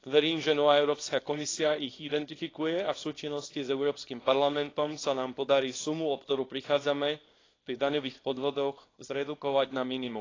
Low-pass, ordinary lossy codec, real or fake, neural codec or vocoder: 7.2 kHz; AAC, 32 kbps; fake; codec, 24 kHz, 0.9 kbps, WavTokenizer, small release